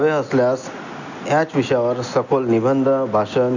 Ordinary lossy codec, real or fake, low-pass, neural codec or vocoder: none; real; 7.2 kHz; none